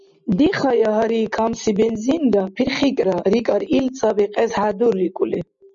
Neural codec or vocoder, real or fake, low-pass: none; real; 7.2 kHz